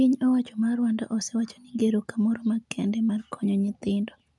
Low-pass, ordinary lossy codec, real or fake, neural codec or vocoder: 10.8 kHz; none; real; none